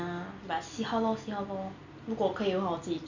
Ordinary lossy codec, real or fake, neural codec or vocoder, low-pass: none; real; none; 7.2 kHz